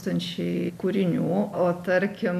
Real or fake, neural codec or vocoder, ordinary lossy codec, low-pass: real; none; MP3, 96 kbps; 14.4 kHz